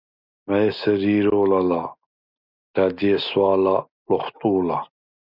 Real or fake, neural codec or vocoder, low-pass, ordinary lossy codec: real; none; 5.4 kHz; Opus, 64 kbps